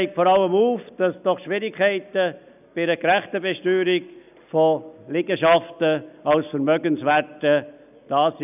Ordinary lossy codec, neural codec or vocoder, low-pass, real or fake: none; none; 3.6 kHz; real